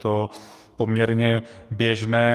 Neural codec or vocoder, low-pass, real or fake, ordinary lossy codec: codec, 44.1 kHz, 2.6 kbps, DAC; 14.4 kHz; fake; Opus, 32 kbps